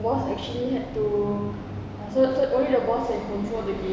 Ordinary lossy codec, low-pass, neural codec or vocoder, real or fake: none; none; none; real